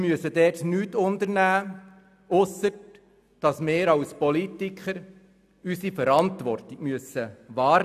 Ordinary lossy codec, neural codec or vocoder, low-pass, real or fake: none; none; 14.4 kHz; real